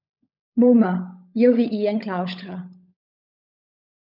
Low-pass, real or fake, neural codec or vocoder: 5.4 kHz; fake; codec, 16 kHz, 16 kbps, FunCodec, trained on LibriTTS, 50 frames a second